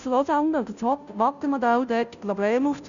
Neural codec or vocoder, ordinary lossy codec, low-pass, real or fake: codec, 16 kHz, 0.5 kbps, FunCodec, trained on Chinese and English, 25 frames a second; none; 7.2 kHz; fake